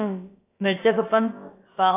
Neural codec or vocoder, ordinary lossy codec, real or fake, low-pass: codec, 16 kHz, about 1 kbps, DyCAST, with the encoder's durations; AAC, 24 kbps; fake; 3.6 kHz